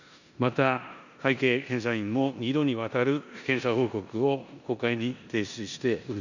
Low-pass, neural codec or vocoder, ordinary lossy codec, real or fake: 7.2 kHz; codec, 16 kHz in and 24 kHz out, 0.9 kbps, LongCat-Audio-Codec, four codebook decoder; none; fake